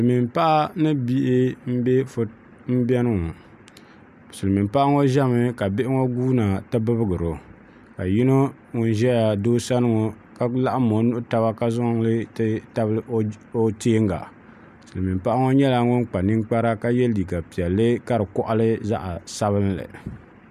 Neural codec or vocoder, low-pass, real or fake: none; 14.4 kHz; real